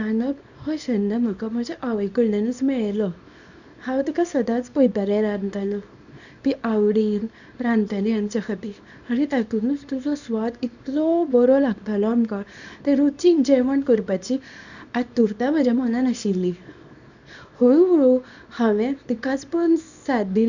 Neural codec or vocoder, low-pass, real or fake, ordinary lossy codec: codec, 24 kHz, 0.9 kbps, WavTokenizer, small release; 7.2 kHz; fake; none